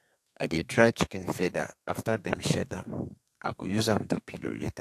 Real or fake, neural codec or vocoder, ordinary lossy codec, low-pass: fake; codec, 32 kHz, 1.9 kbps, SNAC; AAC, 64 kbps; 14.4 kHz